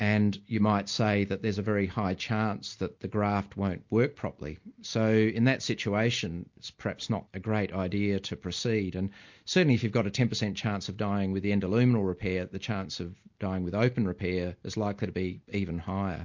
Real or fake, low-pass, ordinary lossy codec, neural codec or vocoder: real; 7.2 kHz; MP3, 48 kbps; none